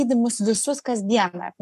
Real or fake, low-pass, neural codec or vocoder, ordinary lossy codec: fake; 14.4 kHz; codec, 44.1 kHz, 7.8 kbps, Pupu-Codec; AAC, 96 kbps